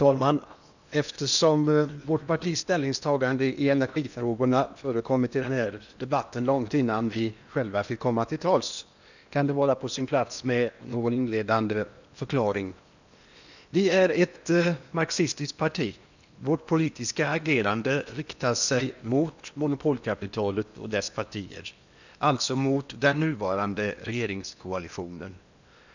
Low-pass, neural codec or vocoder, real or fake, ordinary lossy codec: 7.2 kHz; codec, 16 kHz in and 24 kHz out, 0.8 kbps, FocalCodec, streaming, 65536 codes; fake; none